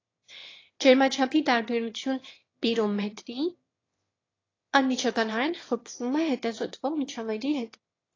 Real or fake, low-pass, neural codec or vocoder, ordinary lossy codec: fake; 7.2 kHz; autoencoder, 22.05 kHz, a latent of 192 numbers a frame, VITS, trained on one speaker; AAC, 32 kbps